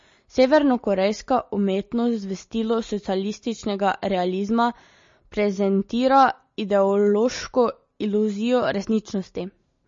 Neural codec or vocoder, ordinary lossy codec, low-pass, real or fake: none; MP3, 32 kbps; 7.2 kHz; real